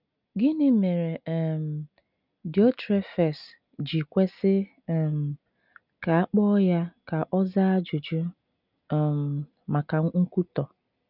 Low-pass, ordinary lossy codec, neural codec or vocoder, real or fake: 5.4 kHz; none; none; real